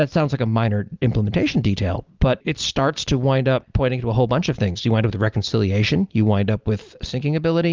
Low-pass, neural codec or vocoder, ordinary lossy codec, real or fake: 7.2 kHz; none; Opus, 32 kbps; real